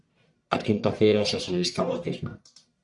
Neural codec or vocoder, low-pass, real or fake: codec, 44.1 kHz, 1.7 kbps, Pupu-Codec; 10.8 kHz; fake